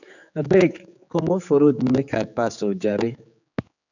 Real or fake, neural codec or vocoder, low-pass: fake; codec, 16 kHz, 4 kbps, X-Codec, HuBERT features, trained on general audio; 7.2 kHz